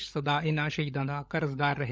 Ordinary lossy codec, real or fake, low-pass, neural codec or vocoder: none; fake; none; codec, 16 kHz, 16 kbps, FunCodec, trained on LibriTTS, 50 frames a second